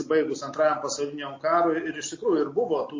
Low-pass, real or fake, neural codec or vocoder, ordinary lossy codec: 7.2 kHz; real; none; MP3, 32 kbps